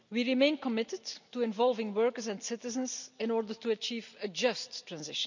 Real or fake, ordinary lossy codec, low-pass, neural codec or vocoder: real; none; 7.2 kHz; none